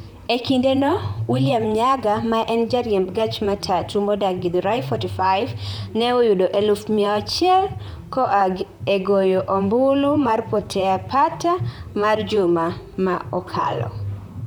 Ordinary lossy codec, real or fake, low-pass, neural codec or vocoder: none; fake; none; vocoder, 44.1 kHz, 128 mel bands, Pupu-Vocoder